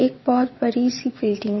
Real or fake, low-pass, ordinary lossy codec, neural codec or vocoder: fake; 7.2 kHz; MP3, 24 kbps; vocoder, 22.05 kHz, 80 mel bands, WaveNeXt